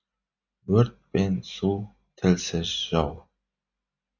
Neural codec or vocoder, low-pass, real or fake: none; 7.2 kHz; real